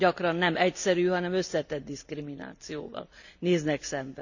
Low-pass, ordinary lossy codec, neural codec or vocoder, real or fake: 7.2 kHz; none; none; real